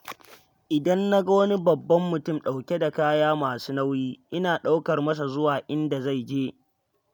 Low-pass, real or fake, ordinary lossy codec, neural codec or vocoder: none; real; none; none